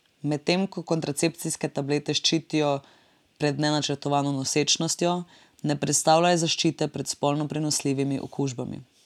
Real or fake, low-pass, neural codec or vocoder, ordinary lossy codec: real; 19.8 kHz; none; none